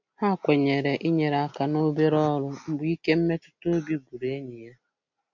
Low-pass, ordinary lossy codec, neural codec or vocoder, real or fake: 7.2 kHz; none; none; real